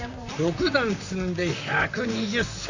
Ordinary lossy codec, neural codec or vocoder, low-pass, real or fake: AAC, 48 kbps; vocoder, 44.1 kHz, 128 mel bands, Pupu-Vocoder; 7.2 kHz; fake